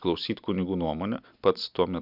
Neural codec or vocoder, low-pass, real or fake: none; 5.4 kHz; real